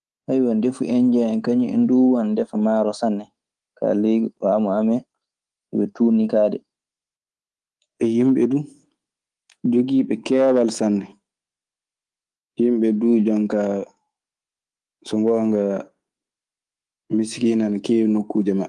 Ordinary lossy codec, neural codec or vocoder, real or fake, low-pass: Opus, 24 kbps; codec, 24 kHz, 3.1 kbps, DualCodec; fake; 10.8 kHz